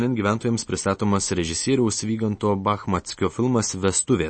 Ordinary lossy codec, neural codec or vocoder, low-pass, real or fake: MP3, 32 kbps; none; 9.9 kHz; real